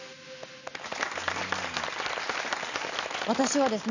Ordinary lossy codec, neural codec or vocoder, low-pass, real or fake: none; none; 7.2 kHz; real